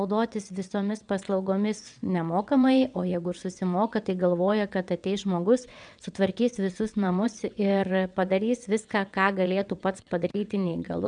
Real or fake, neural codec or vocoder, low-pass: fake; vocoder, 22.05 kHz, 80 mel bands, WaveNeXt; 9.9 kHz